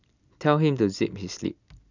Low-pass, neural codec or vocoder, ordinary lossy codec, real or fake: 7.2 kHz; none; none; real